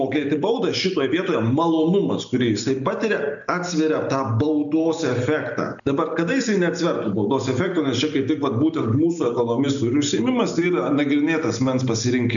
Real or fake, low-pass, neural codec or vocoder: real; 7.2 kHz; none